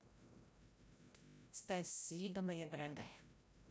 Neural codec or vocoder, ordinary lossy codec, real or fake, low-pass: codec, 16 kHz, 0.5 kbps, FreqCodec, larger model; none; fake; none